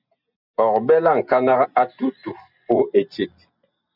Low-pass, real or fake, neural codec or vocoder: 5.4 kHz; real; none